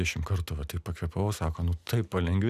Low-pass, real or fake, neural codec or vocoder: 14.4 kHz; real; none